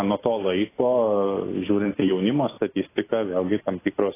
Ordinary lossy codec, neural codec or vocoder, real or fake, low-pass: AAC, 16 kbps; none; real; 3.6 kHz